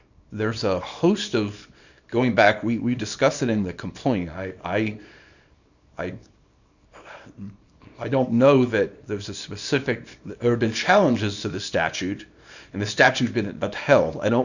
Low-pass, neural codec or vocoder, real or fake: 7.2 kHz; codec, 24 kHz, 0.9 kbps, WavTokenizer, small release; fake